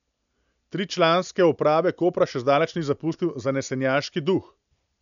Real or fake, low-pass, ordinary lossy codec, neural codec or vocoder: real; 7.2 kHz; none; none